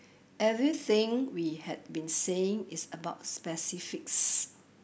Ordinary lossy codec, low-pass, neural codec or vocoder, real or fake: none; none; none; real